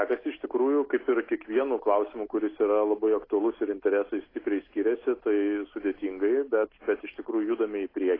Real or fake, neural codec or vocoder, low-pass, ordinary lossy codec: real; none; 5.4 kHz; AAC, 24 kbps